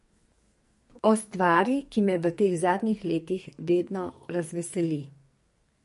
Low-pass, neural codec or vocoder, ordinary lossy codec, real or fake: 14.4 kHz; codec, 44.1 kHz, 2.6 kbps, SNAC; MP3, 48 kbps; fake